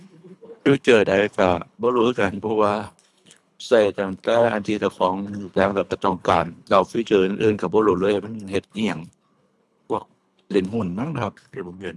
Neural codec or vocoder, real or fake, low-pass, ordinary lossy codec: codec, 24 kHz, 3 kbps, HILCodec; fake; none; none